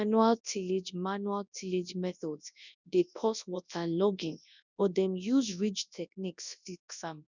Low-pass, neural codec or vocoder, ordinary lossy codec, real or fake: 7.2 kHz; codec, 24 kHz, 0.9 kbps, WavTokenizer, large speech release; none; fake